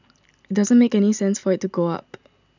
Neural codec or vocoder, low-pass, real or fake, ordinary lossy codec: none; 7.2 kHz; real; none